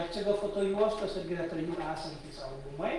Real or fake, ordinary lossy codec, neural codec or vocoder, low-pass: real; Opus, 24 kbps; none; 10.8 kHz